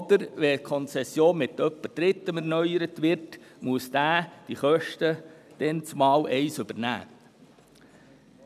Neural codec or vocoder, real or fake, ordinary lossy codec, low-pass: none; real; none; 14.4 kHz